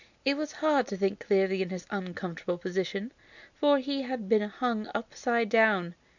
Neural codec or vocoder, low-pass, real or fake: none; 7.2 kHz; real